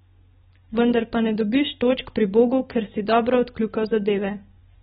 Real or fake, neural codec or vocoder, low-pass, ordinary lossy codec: real; none; 14.4 kHz; AAC, 16 kbps